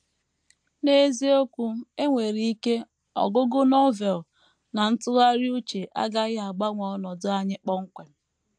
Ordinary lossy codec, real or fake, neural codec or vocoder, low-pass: AAC, 64 kbps; real; none; 9.9 kHz